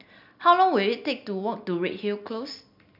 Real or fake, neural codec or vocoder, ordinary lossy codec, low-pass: real; none; none; 5.4 kHz